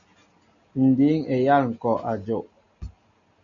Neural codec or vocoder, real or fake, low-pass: none; real; 7.2 kHz